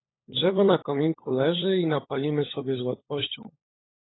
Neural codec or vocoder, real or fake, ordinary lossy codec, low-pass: codec, 16 kHz, 16 kbps, FunCodec, trained on LibriTTS, 50 frames a second; fake; AAC, 16 kbps; 7.2 kHz